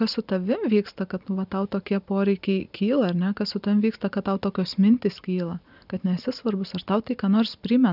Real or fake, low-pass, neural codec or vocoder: real; 5.4 kHz; none